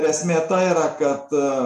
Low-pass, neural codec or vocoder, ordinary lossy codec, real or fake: 14.4 kHz; none; MP3, 64 kbps; real